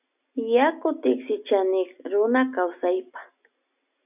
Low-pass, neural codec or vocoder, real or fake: 3.6 kHz; none; real